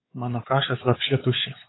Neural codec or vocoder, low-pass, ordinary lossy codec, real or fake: codec, 16 kHz, 4 kbps, X-Codec, WavLM features, trained on Multilingual LibriSpeech; 7.2 kHz; AAC, 16 kbps; fake